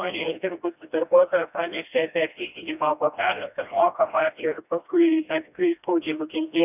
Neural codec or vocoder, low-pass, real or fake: codec, 16 kHz, 1 kbps, FreqCodec, smaller model; 3.6 kHz; fake